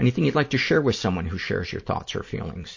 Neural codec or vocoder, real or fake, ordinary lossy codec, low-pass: none; real; MP3, 32 kbps; 7.2 kHz